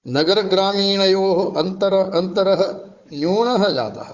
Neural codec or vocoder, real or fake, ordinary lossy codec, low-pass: codec, 16 kHz, 4 kbps, FunCodec, trained on Chinese and English, 50 frames a second; fake; Opus, 64 kbps; 7.2 kHz